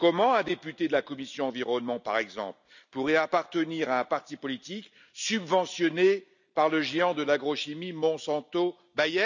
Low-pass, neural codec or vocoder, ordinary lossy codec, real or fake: 7.2 kHz; none; none; real